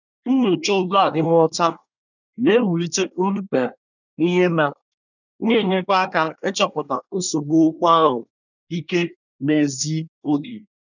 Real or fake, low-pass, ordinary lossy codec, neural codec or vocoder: fake; 7.2 kHz; none; codec, 24 kHz, 1 kbps, SNAC